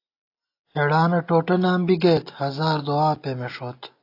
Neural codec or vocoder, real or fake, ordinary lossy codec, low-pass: none; real; AAC, 32 kbps; 5.4 kHz